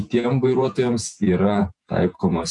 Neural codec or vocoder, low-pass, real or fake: vocoder, 48 kHz, 128 mel bands, Vocos; 10.8 kHz; fake